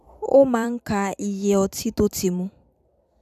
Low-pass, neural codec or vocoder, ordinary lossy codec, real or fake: 14.4 kHz; vocoder, 44.1 kHz, 128 mel bands every 256 samples, BigVGAN v2; none; fake